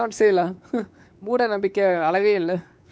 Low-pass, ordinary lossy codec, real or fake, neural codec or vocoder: none; none; fake; codec, 16 kHz, 4 kbps, X-Codec, WavLM features, trained on Multilingual LibriSpeech